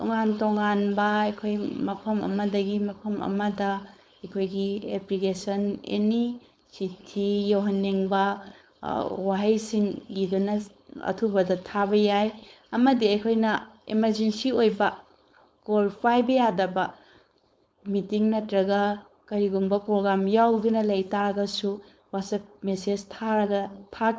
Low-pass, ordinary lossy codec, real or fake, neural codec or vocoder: none; none; fake; codec, 16 kHz, 4.8 kbps, FACodec